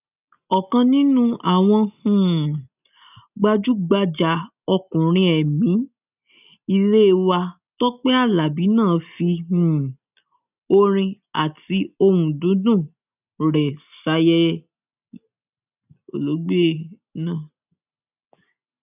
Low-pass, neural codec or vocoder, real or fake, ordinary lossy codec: 3.6 kHz; none; real; none